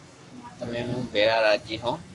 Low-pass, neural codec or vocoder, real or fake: 10.8 kHz; codec, 44.1 kHz, 7.8 kbps, Pupu-Codec; fake